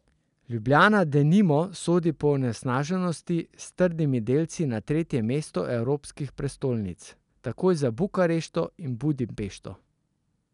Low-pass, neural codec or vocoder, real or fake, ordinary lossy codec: 10.8 kHz; none; real; none